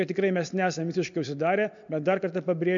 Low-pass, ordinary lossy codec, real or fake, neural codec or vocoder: 7.2 kHz; MP3, 64 kbps; real; none